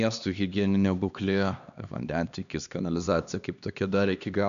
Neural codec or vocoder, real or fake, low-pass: codec, 16 kHz, 2 kbps, X-Codec, HuBERT features, trained on LibriSpeech; fake; 7.2 kHz